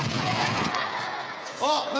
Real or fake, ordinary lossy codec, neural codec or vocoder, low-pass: fake; none; codec, 16 kHz, 16 kbps, FreqCodec, smaller model; none